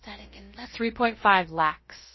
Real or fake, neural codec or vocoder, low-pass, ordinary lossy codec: fake; codec, 16 kHz, about 1 kbps, DyCAST, with the encoder's durations; 7.2 kHz; MP3, 24 kbps